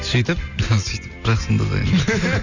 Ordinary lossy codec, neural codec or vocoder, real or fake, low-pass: none; none; real; 7.2 kHz